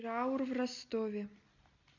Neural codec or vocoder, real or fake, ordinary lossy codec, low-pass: none; real; none; 7.2 kHz